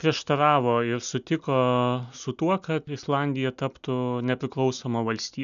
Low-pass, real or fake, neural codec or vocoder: 7.2 kHz; real; none